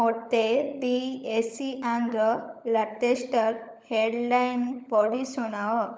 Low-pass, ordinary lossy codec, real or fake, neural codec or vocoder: none; none; fake; codec, 16 kHz, 16 kbps, FunCodec, trained on LibriTTS, 50 frames a second